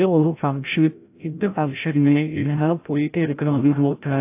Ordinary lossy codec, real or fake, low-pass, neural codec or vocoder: AAC, 24 kbps; fake; 3.6 kHz; codec, 16 kHz, 0.5 kbps, FreqCodec, larger model